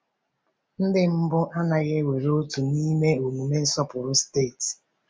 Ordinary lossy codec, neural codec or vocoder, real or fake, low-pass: none; none; real; none